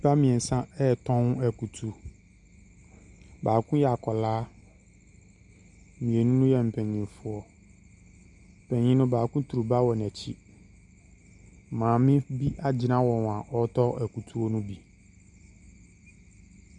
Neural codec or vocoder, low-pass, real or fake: none; 10.8 kHz; real